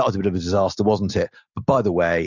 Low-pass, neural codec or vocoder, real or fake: 7.2 kHz; none; real